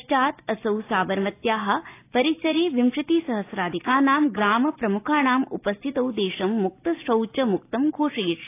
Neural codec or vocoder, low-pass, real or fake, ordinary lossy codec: none; 3.6 kHz; real; AAC, 24 kbps